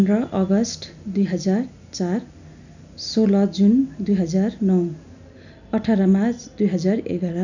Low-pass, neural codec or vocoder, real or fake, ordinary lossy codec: 7.2 kHz; none; real; none